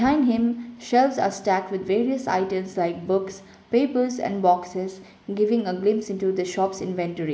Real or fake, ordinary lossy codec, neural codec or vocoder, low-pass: real; none; none; none